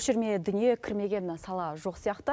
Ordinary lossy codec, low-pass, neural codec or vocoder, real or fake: none; none; none; real